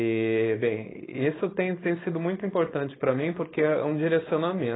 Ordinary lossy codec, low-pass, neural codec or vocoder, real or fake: AAC, 16 kbps; 7.2 kHz; codec, 16 kHz, 4.8 kbps, FACodec; fake